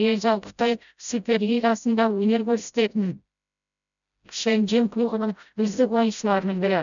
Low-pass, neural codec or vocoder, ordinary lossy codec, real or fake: 7.2 kHz; codec, 16 kHz, 0.5 kbps, FreqCodec, smaller model; none; fake